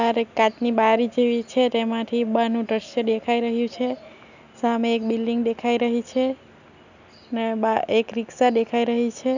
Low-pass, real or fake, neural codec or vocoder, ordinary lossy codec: 7.2 kHz; real; none; none